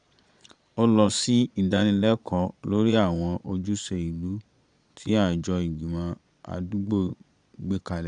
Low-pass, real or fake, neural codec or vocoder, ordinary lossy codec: 9.9 kHz; fake; vocoder, 22.05 kHz, 80 mel bands, Vocos; none